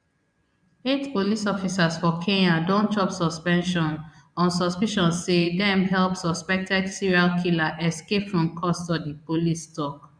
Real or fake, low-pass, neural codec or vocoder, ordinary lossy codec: fake; 9.9 kHz; vocoder, 24 kHz, 100 mel bands, Vocos; none